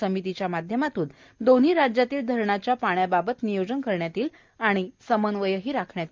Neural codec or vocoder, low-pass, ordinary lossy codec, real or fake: none; 7.2 kHz; Opus, 24 kbps; real